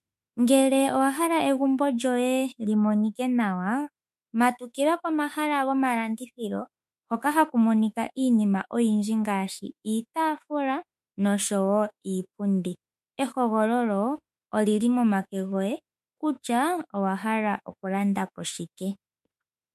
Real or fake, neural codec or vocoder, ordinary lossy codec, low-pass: fake; autoencoder, 48 kHz, 32 numbers a frame, DAC-VAE, trained on Japanese speech; MP3, 64 kbps; 14.4 kHz